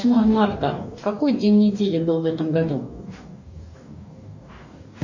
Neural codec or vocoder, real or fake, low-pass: codec, 44.1 kHz, 2.6 kbps, DAC; fake; 7.2 kHz